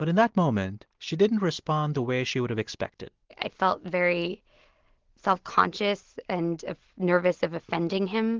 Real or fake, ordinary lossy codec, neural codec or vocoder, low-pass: real; Opus, 16 kbps; none; 7.2 kHz